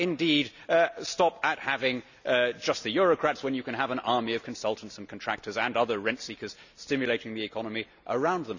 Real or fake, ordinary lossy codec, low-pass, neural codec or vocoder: real; none; 7.2 kHz; none